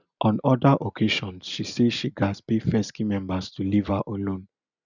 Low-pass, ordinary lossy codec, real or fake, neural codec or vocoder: 7.2 kHz; none; real; none